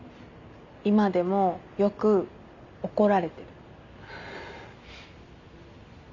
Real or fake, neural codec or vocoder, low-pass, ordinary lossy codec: real; none; 7.2 kHz; none